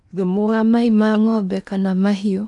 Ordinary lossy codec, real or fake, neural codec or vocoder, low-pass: none; fake; codec, 16 kHz in and 24 kHz out, 0.8 kbps, FocalCodec, streaming, 65536 codes; 10.8 kHz